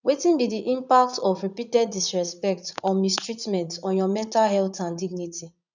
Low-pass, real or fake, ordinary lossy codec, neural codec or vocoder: 7.2 kHz; fake; none; vocoder, 44.1 kHz, 80 mel bands, Vocos